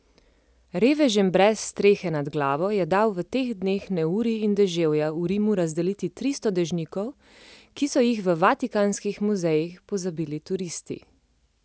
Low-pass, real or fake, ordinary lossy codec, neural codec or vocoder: none; real; none; none